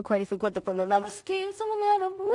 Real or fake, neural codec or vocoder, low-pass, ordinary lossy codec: fake; codec, 16 kHz in and 24 kHz out, 0.4 kbps, LongCat-Audio-Codec, two codebook decoder; 10.8 kHz; AAC, 64 kbps